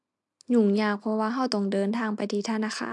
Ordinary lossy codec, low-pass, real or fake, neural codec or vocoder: MP3, 96 kbps; 10.8 kHz; real; none